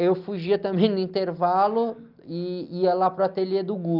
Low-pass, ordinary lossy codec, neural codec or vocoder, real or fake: 5.4 kHz; Opus, 32 kbps; none; real